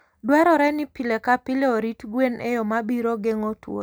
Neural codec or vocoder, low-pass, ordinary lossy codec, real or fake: none; none; none; real